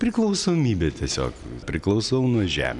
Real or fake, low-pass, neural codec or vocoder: real; 10.8 kHz; none